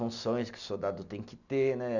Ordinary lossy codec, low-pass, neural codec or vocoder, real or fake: none; 7.2 kHz; none; real